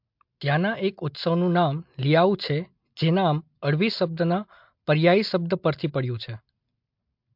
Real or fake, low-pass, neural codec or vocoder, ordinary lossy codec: real; 5.4 kHz; none; MP3, 48 kbps